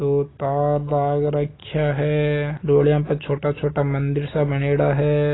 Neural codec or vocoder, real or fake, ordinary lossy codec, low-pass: none; real; AAC, 16 kbps; 7.2 kHz